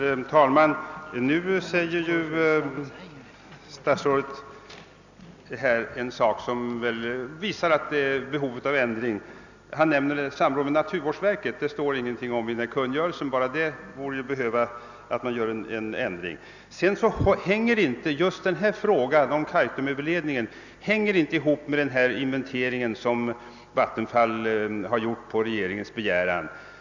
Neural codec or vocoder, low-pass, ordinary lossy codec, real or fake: none; 7.2 kHz; none; real